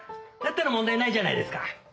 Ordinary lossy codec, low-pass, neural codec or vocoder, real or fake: none; none; none; real